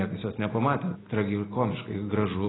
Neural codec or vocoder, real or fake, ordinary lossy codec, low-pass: none; real; AAC, 16 kbps; 7.2 kHz